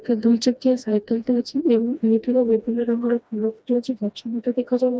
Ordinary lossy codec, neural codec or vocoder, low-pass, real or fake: none; codec, 16 kHz, 1 kbps, FreqCodec, smaller model; none; fake